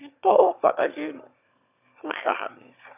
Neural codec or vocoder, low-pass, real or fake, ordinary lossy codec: autoencoder, 22.05 kHz, a latent of 192 numbers a frame, VITS, trained on one speaker; 3.6 kHz; fake; none